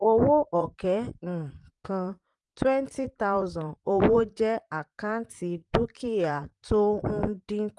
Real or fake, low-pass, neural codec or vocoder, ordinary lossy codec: fake; 10.8 kHz; vocoder, 44.1 kHz, 128 mel bands, Pupu-Vocoder; Opus, 32 kbps